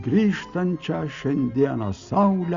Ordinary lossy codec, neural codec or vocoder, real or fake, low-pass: Opus, 64 kbps; none; real; 7.2 kHz